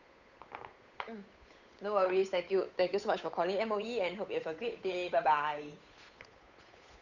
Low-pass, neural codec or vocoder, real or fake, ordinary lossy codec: 7.2 kHz; codec, 16 kHz, 8 kbps, FunCodec, trained on Chinese and English, 25 frames a second; fake; none